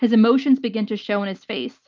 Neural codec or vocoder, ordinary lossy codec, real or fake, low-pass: none; Opus, 32 kbps; real; 7.2 kHz